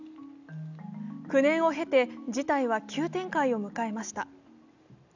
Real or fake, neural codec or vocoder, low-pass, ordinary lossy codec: real; none; 7.2 kHz; none